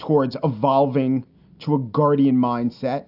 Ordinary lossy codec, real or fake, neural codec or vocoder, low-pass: AAC, 48 kbps; real; none; 5.4 kHz